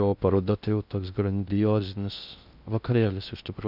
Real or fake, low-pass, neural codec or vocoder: fake; 5.4 kHz; codec, 16 kHz in and 24 kHz out, 0.6 kbps, FocalCodec, streaming, 2048 codes